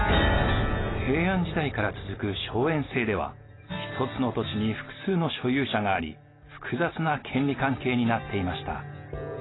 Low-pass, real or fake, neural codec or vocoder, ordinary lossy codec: 7.2 kHz; real; none; AAC, 16 kbps